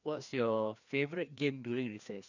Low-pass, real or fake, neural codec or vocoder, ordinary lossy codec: 7.2 kHz; fake; codec, 16 kHz, 2 kbps, FreqCodec, larger model; none